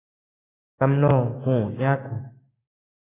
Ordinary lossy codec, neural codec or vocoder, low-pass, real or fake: AAC, 16 kbps; codec, 16 kHz, 6 kbps, DAC; 3.6 kHz; fake